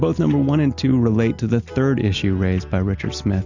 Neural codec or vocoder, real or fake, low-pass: none; real; 7.2 kHz